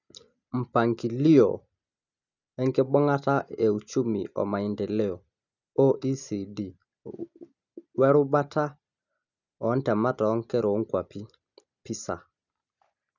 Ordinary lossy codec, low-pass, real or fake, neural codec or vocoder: none; 7.2 kHz; real; none